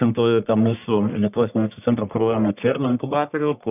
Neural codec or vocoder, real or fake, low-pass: codec, 44.1 kHz, 1.7 kbps, Pupu-Codec; fake; 3.6 kHz